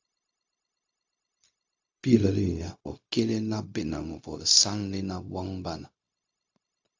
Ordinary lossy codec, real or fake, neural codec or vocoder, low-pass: AAC, 48 kbps; fake; codec, 16 kHz, 0.4 kbps, LongCat-Audio-Codec; 7.2 kHz